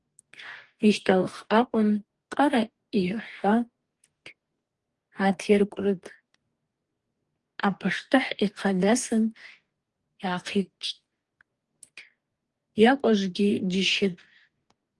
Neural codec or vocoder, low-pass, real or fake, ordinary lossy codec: codec, 44.1 kHz, 2.6 kbps, DAC; 10.8 kHz; fake; Opus, 24 kbps